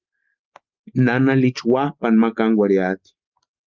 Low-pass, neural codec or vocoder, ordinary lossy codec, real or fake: 7.2 kHz; none; Opus, 32 kbps; real